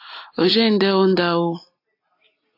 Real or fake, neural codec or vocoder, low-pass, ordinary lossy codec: real; none; 5.4 kHz; AAC, 32 kbps